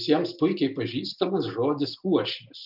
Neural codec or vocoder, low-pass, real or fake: none; 5.4 kHz; real